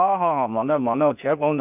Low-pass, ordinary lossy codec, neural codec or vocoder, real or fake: 3.6 kHz; none; codec, 16 kHz, 0.8 kbps, ZipCodec; fake